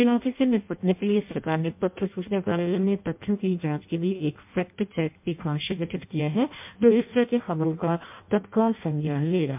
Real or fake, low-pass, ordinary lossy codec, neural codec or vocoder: fake; 3.6 kHz; MP3, 32 kbps; codec, 16 kHz in and 24 kHz out, 0.6 kbps, FireRedTTS-2 codec